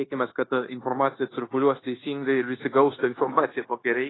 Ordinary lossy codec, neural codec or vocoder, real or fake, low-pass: AAC, 16 kbps; codec, 16 kHz, 0.9 kbps, LongCat-Audio-Codec; fake; 7.2 kHz